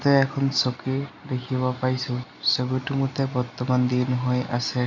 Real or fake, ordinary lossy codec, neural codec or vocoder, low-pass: real; none; none; 7.2 kHz